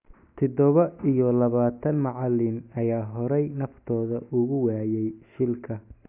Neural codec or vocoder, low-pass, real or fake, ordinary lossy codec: none; 3.6 kHz; real; AAC, 32 kbps